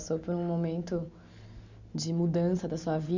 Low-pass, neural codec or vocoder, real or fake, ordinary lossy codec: 7.2 kHz; none; real; none